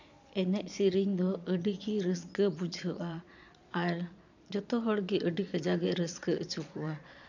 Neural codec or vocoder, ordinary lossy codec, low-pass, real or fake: vocoder, 22.05 kHz, 80 mel bands, Vocos; none; 7.2 kHz; fake